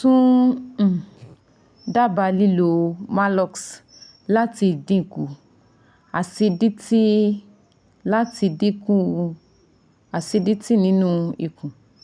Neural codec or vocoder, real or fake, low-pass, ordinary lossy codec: none; real; 9.9 kHz; none